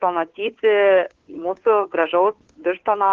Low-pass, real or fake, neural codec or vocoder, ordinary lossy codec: 7.2 kHz; fake; codec, 16 kHz, 2 kbps, FunCodec, trained on Chinese and English, 25 frames a second; Opus, 16 kbps